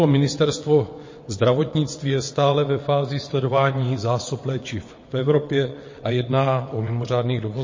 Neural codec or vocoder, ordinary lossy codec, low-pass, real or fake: vocoder, 22.05 kHz, 80 mel bands, WaveNeXt; MP3, 32 kbps; 7.2 kHz; fake